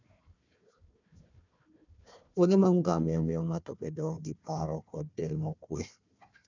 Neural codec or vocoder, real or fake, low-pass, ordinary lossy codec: codec, 16 kHz, 1 kbps, FunCodec, trained on Chinese and English, 50 frames a second; fake; 7.2 kHz; none